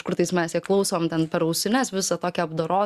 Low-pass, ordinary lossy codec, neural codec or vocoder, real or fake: 14.4 kHz; Opus, 64 kbps; none; real